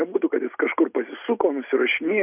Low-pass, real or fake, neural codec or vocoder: 3.6 kHz; real; none